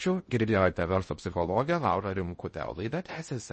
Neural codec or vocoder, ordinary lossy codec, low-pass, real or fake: codec, 16 kHz in and 24 kHz out, 0.6 kbps, FocalCodec, streaming, 2048 codes; MP3, 32 kbps; 10.8 kHz; fake